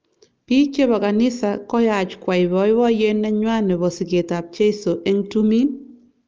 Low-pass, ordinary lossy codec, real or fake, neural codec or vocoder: 7.2 kHz; Opus, 24 kbps; real; none